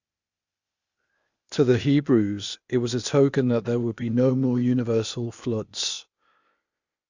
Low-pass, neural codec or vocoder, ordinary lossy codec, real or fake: 7.2 kHz; codec, 16 kHz, 0.8 kbps, ZipCodec; Opus, 64 kbps; fake